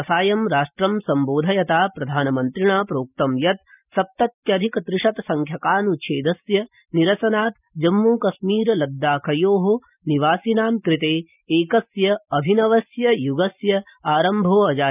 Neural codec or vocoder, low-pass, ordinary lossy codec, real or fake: none; 3.6 kHz; none; real